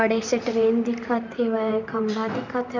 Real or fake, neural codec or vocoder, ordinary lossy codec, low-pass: fake; vocoder, 44.1 kHz, 128 mel bands, Pupu-Vocoder; none; 7.2 kHz